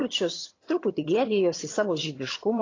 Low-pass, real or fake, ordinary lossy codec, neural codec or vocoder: 7.2 kHz; fake; AAC, 32 kbps; vocoder, 22.05 kHz, 80 mel bands, HiFi-GAN